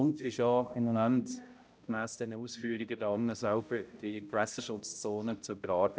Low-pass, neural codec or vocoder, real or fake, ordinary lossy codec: none; codec, 16 kHz, 0.5 kbps, X-Codec, HuBERT features, trained on balanced general audio; fake; none